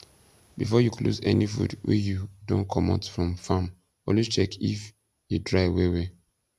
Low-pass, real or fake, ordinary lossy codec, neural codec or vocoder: 14.4 kHz; real; none; none